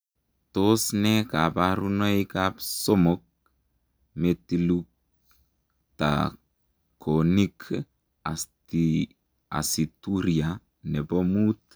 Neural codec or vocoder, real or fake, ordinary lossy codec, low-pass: none; real; none; none